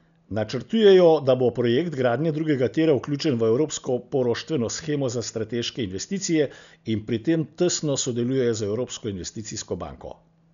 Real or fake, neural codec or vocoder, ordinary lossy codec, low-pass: real; none; none; 7.2 kHz